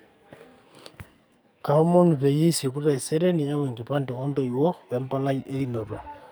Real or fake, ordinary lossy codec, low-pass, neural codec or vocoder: fake; none; none; codec, 44.1 kHz, 2.6 kbps, SNAC